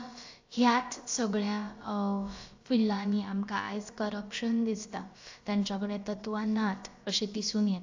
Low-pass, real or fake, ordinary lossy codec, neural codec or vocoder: 7.2 kHz; fake; AAC, 48 kbps; codec, 16 kHz, about 1 kbps, DyCAST, with the encoder's durations